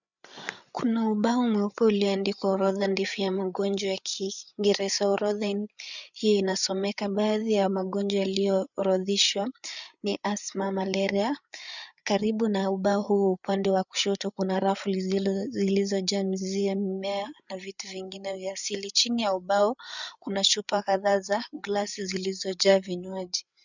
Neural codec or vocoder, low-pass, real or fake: codec, 16 kHz, 8 kbps, FreqCodec, larger model; 7.2 kHz; fake